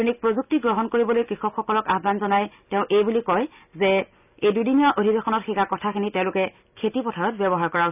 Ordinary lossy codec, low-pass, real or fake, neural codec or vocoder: none; 3.6 kHz; real; none